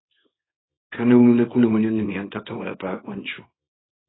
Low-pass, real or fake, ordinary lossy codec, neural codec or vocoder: 7.2 kHz; fake; AAC, 16 kbps; codec, 24 kHz, 0.9 kbps, WavTokenizer, small release